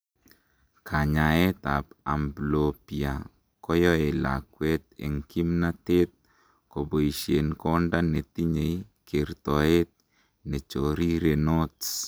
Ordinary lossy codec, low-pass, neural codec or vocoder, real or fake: none; none; none; real